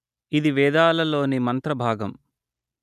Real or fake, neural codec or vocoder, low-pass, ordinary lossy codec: real; none; 14.4 kHz; none